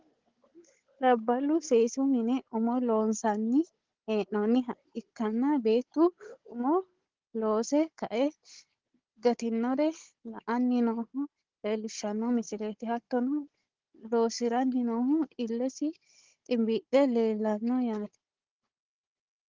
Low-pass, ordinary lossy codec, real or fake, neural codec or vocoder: 7.2 kHz; Opus, 16 kbps; fake; codec, 16 kHz, 16 kbps, FunCodec, trained on Chinese and English, 50 frames a second